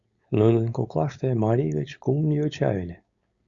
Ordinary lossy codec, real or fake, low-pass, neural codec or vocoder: Opus, 64 kbps; fake; 7.2 kHz; codec, 16 kHz, 4.8 kbps, FACodec